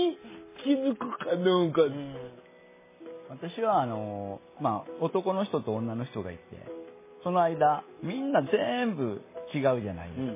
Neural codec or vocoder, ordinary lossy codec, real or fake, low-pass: none; MP3, 16 kbps; real; 3.6 kHz